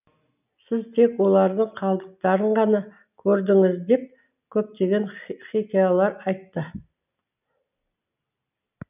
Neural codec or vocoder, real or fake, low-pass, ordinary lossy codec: none; real; 3.6 kHz; none